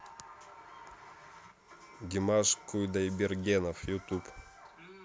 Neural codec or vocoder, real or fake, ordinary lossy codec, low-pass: none; real; none; none